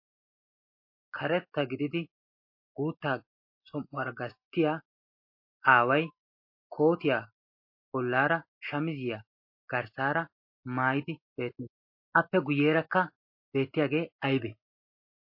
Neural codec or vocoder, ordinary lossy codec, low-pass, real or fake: none; MP3, 32 kbps; 5.4 kHz; real